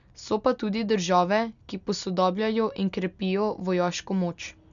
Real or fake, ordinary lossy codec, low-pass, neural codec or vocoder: real; none; 7.2 kHz; none